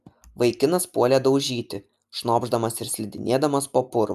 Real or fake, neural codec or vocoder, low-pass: real; none; 14.4 kHz